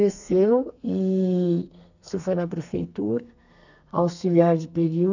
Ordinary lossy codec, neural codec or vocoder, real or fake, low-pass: none; codec, 32 kHz, 1.9 kbps, SNAC; fake; 7.2 kHz